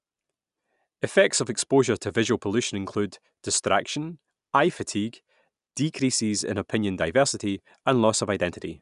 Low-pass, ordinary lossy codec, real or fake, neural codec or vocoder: 10.8 kHz; none; real; none